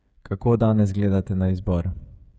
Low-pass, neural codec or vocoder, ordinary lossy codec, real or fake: none; codec, 16 kHz, 8 kbps, FreqCodec, smaller model; none; fake